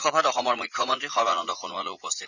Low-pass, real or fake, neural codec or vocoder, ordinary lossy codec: 7.2 kHz; fake; vocoder, 44.1 kHz, 80 mel bands, Vocos; none